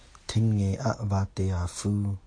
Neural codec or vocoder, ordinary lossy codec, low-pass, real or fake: none; AAC, 48 kbps; 9.9 kHz; real